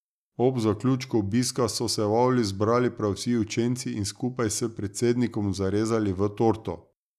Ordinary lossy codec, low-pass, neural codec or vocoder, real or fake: none; 14.4 kHz; none; real